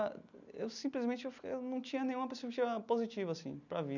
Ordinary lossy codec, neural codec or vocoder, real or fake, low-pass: none; none; real; 7.2 kHz